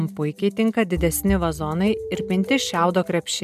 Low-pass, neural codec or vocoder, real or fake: 14.4 kHz; none; real